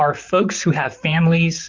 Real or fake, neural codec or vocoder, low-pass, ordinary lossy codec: fake; vocoder, 44.1 kHz, 128 mel bands, Pupu-Vocoder; 7.2 kHz; Opus, 24 kbps